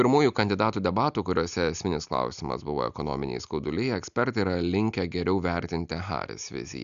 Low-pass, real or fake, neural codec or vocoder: 7.2 kHz; real; none